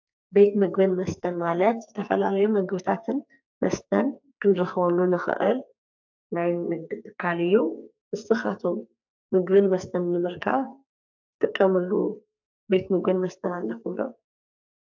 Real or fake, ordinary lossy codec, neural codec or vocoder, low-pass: fake; AAC, 48 kbps; codec, 44.1 kHz, 2.6 kbps, SNAC; 7.2 kHz